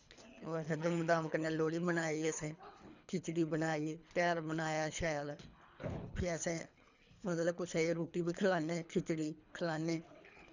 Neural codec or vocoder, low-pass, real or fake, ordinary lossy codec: codec, 24 kHz, 3 kbps, HILCodec; 7.2 kHz; fake; none